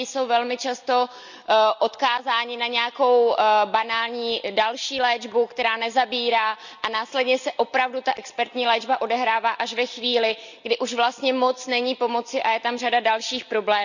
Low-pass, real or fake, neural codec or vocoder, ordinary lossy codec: 7.2 kHz; real; none; none